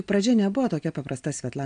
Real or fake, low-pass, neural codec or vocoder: real; 9.9 kHz; none